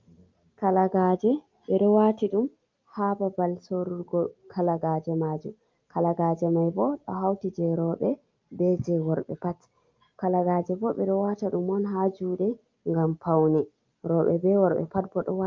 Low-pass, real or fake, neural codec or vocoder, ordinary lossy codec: 7.2 kHz; real; none; Opus, 24 kbps